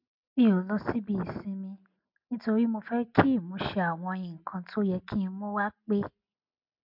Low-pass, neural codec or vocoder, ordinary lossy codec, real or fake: 5.4 kHz; none; MP3, 48 kbps; real